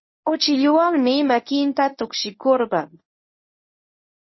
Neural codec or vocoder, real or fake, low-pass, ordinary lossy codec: codec, 24 kHz, 0.9 kbps, WavTokenizer, medium speech release version 1; fake; 7.2 kHz; MP3, 24 kbps